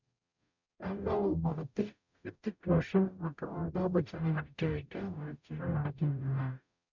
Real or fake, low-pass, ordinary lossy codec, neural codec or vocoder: fake; 7.2 kHz; none; codec, 44.1 kHz, 0.9 kbps, DAC